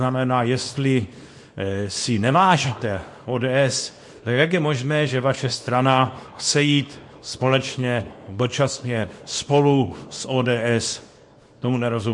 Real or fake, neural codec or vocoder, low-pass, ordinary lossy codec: fake; codec, 24 kHz, 0.9 kbps, WavTokenizer, small release; 10.8 kHz; MP3, 48 kbps